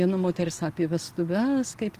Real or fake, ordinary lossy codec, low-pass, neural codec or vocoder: real; Opus, 24 kbps; 14.4 kHz; none